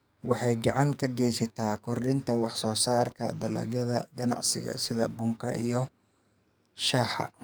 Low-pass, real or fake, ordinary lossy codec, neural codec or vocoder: none; fake; none; codec, 44.1 kHz, 2.6 kbps, SNAC